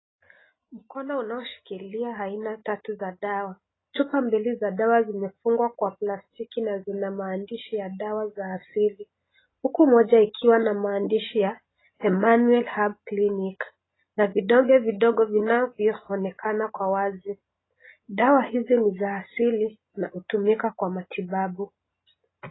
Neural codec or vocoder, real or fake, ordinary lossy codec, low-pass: none; real; AAC, 16 kbps; 7.2 kHz